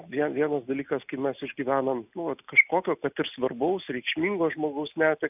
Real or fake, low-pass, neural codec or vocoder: real; 3.6 kHz; none